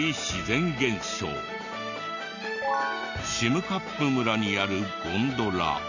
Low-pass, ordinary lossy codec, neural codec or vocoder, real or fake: 7.2 kHz; none; none; real